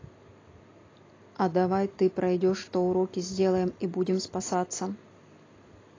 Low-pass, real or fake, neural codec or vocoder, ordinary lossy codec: 7.2 kHz; real; none; AAC, 32 kbps